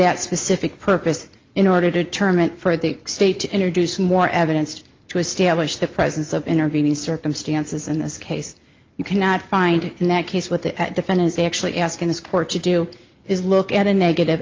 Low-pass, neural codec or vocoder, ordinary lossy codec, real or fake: 7.2 kHz; none; Opus, 32 kbps; real